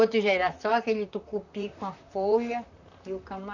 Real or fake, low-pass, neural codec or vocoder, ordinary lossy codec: fake; 7.2 kHz; vocoder, 44.1 kHz, 128 mel bands, Pupu-Vocoder; none